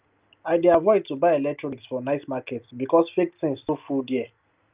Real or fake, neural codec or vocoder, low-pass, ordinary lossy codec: real; none; 3.6 kHz; Opus, 32 kbps